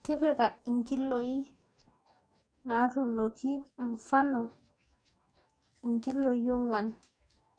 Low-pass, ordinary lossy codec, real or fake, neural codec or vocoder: 9.9 kHz; none; fake; codec, 44.1 kHz, 2.6 kbps, DAC